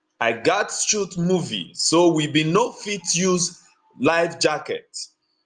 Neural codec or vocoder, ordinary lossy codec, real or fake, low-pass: none; Opus, 32 kbps; real; 9.9 kHz